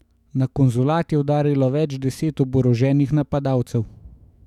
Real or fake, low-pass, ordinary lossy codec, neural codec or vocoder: fake; 19.8 kHz; none; autoencoder, 48 kHz, 128 numbers a frame, DAC-VAE, trained on Japanese speech